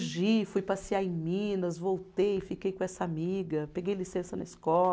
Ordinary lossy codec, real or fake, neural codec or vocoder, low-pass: none; real; none; none